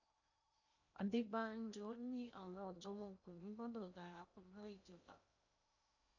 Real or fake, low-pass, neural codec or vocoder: fake; 7.2 kHz; codec, 16 kHz in and 24 kHz out, 0.8 kbps, FocalCodec, streaming, 65536 codes